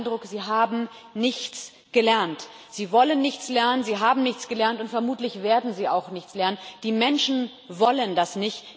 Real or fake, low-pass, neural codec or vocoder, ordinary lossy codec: real; none; none; none